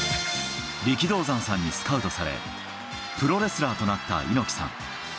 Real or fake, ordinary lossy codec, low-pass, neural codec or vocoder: real; none; none; none